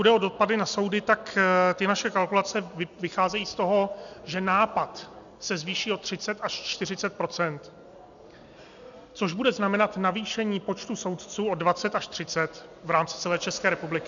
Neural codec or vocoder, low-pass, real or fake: none; 7.2 kHz; real